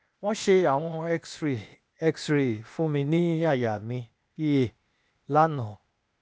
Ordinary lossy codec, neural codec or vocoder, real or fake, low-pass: none; codec, 16 kHz, 0.8 kbps, ZipCodec; fake; none